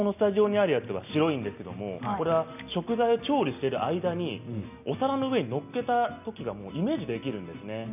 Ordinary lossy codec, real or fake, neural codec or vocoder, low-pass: none; real; none; 3.6 kHz